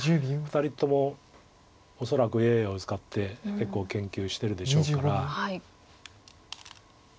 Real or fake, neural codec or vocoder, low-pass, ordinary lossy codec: real; none; none; none